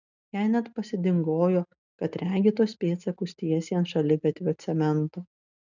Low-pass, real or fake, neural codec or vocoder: 7.2 kHz; real; none